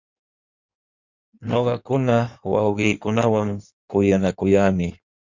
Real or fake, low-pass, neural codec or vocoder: fake; 7.2 kHz; codec, 16 kHz in and 24 kHz out, 1.1 kbps, FireRedTTS-2 codec